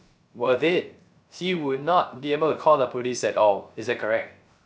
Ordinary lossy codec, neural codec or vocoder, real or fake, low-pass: none; codec, 16 kHz, 0.3 kbps, FocalCodec; fake; none